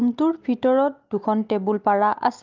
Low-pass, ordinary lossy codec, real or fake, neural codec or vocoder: 7.2 kHz; Opus, 24 kbps; real; none